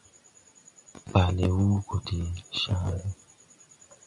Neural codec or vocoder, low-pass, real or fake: none; 10.8 kHz; real